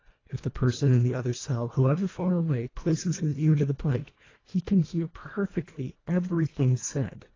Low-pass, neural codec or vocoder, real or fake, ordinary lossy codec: 7.2 kHz; codec, 24 kHz, 1.5 kbps, HILCodec; fake; AAC, 32 kbps